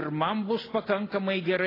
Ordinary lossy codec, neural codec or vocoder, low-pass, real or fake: AAC, 24 kbps; none; 5.4 kHz; real